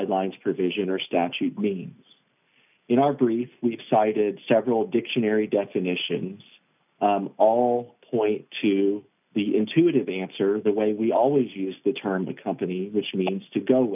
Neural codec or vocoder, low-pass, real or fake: none; 3.6 kHz; real